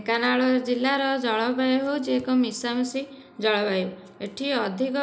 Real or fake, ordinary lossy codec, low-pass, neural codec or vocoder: real; none; none; none